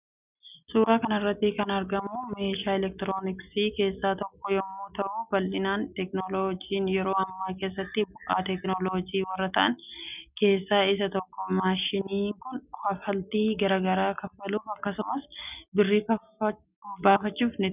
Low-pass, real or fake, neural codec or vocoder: 3.6 kHz; real; none